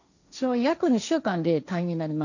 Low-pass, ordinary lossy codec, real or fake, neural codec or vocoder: 7.2 kHz; none; fake; codec, 16 kHz, 1.1 kbps, Voila-Tokenizer